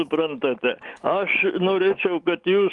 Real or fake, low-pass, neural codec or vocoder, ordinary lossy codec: fake; 10.8 kHz; autoencoder, 48 kHz, 128 numbers a frame, DAC-VAE, trained on Japanese speech; Opus, 32 kbps